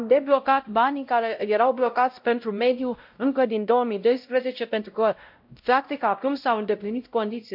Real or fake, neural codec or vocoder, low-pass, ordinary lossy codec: fake; codec, 16 kHz, 0.5 kbps, X-Codec, WavLM features, trained on Multilingual LibriSpeech; 5.4 kHz; MP3, 48 kbps